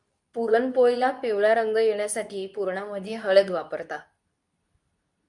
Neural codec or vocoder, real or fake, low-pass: codec, 24 kHz, 0.9 kbps, WavTokenizer, medium speech release version 2; fake; 10.8 kHz